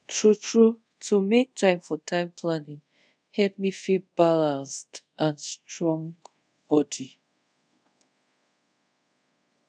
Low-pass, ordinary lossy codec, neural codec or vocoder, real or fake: 9.9 kHz; none; codec, 24 kHz, 0.5 kbps, DualCodec; fake